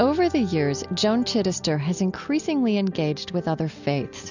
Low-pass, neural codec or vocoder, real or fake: 7.2 kHz; none; real